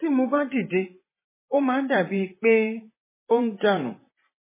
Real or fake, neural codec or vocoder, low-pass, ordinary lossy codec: fake; vocoder, 44.1 kHz, 128 mel bands, Pupu-Vocoder; 3.6 kHz; MP3, 16 kbps